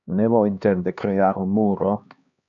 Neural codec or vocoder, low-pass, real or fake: codec, 16 kHz, 2 kbps, X-Codec, HuBERT features, trained on LibriSpeech; 7.2 kHz; fake